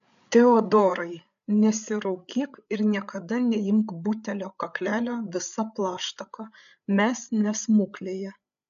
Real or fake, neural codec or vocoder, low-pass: fake; codec, 16 kHz, 8 kbps, FreqCodec, larger model; 7.2 kHz